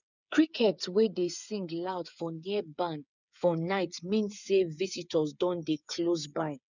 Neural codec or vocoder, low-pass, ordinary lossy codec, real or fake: codec, 16 kHz, 8 kbps, FreqCodec, smaller model; 7.2 kHz; none; fake